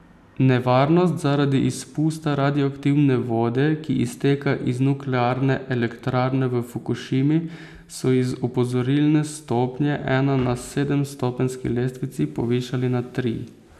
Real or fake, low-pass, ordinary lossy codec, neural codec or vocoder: real; 14.4 kHz; none; none